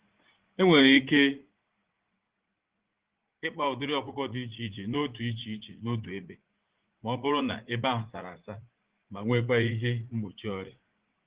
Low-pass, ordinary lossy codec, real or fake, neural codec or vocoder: 3.6 kHz; Opus, 16 kbps; fake; vocoder, 44.1 kHz, 80 mel bands, Vocos